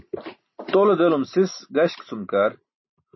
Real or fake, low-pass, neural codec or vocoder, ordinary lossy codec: real; 7.2 kHz; none; MP3, 24 kbps